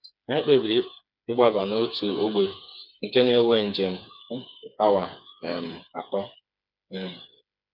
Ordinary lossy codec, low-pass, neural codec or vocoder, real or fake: none; 5.4 kHz; codec, 16 kHz, 4 kbps, FreqCodec, smaller model; fake